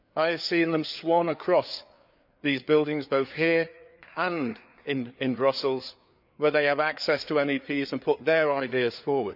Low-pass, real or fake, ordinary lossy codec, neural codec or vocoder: 5.4 kHz; fake; none; codec, 16 kHz, 4 kbps, FreqCodec, larger model